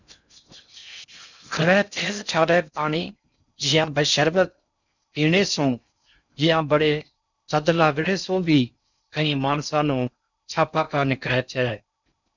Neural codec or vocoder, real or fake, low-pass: codec, 16 kHz in and 24 kHz out, 0.6 kbps, FocalCodec, streaming, 4096 codes; fake; 7.2 kHz